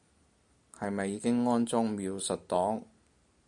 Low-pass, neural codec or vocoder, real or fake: 10.8 kHz; none; real